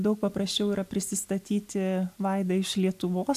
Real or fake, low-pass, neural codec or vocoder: real; 14.4 kHz; none